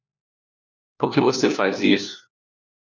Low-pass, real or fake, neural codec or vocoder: 7.2 kHz; fake; codec, 16 kHz, 1 kbps, FunCodec, trained on LibriTTS, 50 frames a second